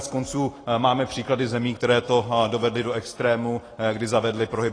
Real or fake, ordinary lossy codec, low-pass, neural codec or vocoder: real; AAC, 32 kbps; 9.9 kHz; none